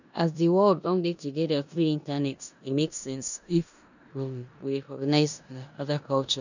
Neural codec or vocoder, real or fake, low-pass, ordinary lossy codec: codec, 16 kHz in and 24 kHz out, 0.9 kbps, LongCat-Audio-Codec, four codebook decoder; fake; 7.2 kHz; none